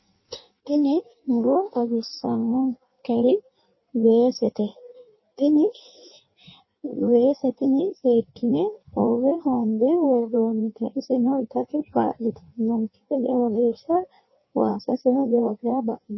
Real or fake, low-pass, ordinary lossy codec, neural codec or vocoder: fake; 7.2 kHz; MP3, 24 kbps; codec, 16 kHz in and 24 kHz out, 1.1 kbps, FireRedTTS-2 codec